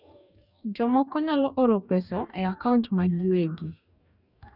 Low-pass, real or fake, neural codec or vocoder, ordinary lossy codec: 5.4 kHz; fake; codec, 44.1 kHz, 2.6 kbps, DAC; none